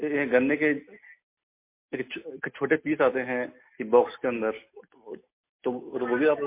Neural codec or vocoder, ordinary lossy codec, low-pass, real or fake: none; MP3, 24 kbps; 3.6 kHz; real